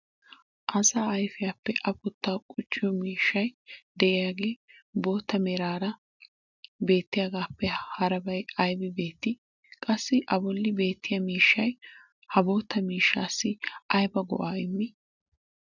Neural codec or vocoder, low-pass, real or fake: none; 7.2 kHz; real